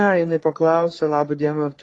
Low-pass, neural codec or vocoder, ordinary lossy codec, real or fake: 10.8 kHz; codec, 44.1 kHz, 3.4 kbps, Pupu-Codec; AAC, 32 kbps; fake